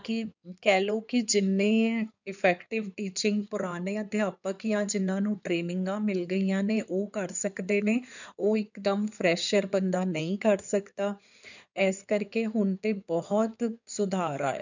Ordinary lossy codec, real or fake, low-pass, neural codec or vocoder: none; fake; 7.2 kHz; codec, 16 kHz in and 24 kHz out, 2.2 kbps, FireRedTTS-2 codec